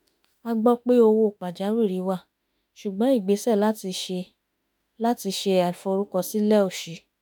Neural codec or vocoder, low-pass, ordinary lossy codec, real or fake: autoencoder, 48 kHz, 32 numbers a frame, DAC-VAE, trained on Japanese speech; none; none; fake